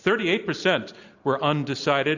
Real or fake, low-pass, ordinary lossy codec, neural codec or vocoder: real; 7.2 kHz; Opus, 64 kbps; none